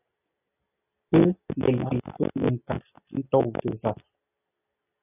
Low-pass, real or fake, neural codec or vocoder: 3.6 kHz; real; none